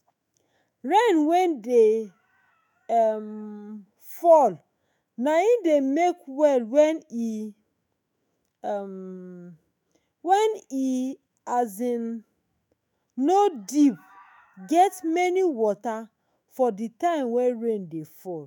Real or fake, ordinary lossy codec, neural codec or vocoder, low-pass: fake; none; autoencoder, 48 kHz, 128 numbers a frame, DAC-VAE, trained on Japanese speech; 19.8 kHz